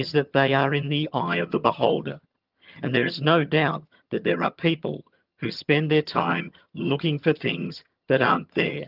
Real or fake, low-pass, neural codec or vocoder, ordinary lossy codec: fake; 5.4 kHz; vocoder, 22.05 kHz, 80 mel bands, HiFi-GAN; Opus, 24 kbps